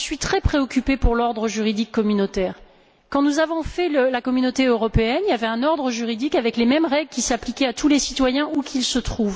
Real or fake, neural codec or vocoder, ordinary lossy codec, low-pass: real; none; none; none